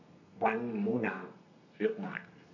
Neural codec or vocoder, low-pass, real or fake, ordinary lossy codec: codec, 32 kHz, 1.9 kbps, SNAC; 7.2 kHz; fake; none